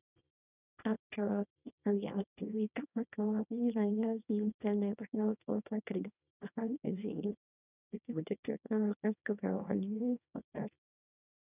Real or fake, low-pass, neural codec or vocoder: fake; 3.6 kHz; codec, 24 kHz, 0.9 kbps, WavTokenizer, small release